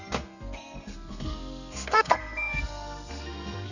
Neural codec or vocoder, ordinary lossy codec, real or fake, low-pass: codec, 32 kHz, 1.9 kbps, SNAC; none; fake; 7.2 kHz